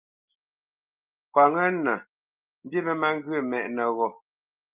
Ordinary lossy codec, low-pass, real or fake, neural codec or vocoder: Opus, 32 kbps; 3.6 kHz; real; none